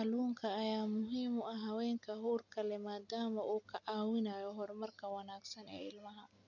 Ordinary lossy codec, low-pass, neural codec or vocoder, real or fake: none; 7.2 kHz; none; real